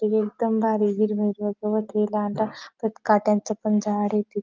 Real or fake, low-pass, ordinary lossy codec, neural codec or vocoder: real; 7.2 kHz; Opus, 24 kbps; none